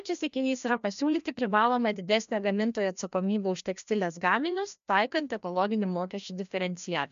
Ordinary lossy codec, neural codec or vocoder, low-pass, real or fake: MP3, 64 kbps; codec, 16 kHz, 1 kbps, FreqCodec, larger model; 7.2 kHz; fake